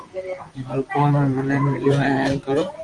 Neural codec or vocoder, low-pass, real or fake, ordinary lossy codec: vocoder, 44.1 kHz, 128 mel bands, Pupu-Vocoder; 10.8 kHz; fake; Opus, 32 kbps